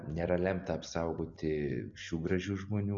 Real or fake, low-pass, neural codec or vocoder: real; 7.2 kHz; none